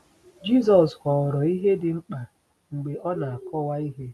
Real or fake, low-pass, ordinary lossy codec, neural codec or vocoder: real; none; none; none